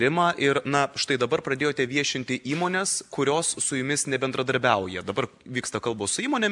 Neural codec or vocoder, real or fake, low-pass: none; real; 10.8 kHz